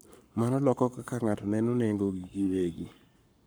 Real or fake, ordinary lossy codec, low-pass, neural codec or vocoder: fake; none; none; codec, 44.1 kHz, 7.8 kbps, Pupu-Codec